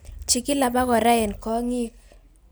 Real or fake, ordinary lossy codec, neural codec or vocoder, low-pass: real; none; none; none